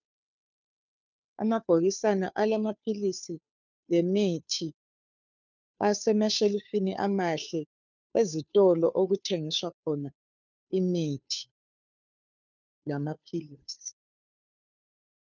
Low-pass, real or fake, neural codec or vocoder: 7.2 kHz; fake; codec, 16 kHz, 2 kbps, FunCodec, trained on Chinese and English, 25 frames a second